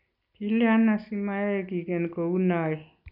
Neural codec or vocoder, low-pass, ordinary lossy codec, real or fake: none; 5.4 kHz; none; real